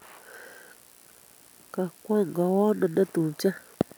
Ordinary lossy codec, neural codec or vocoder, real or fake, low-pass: none; none; real; none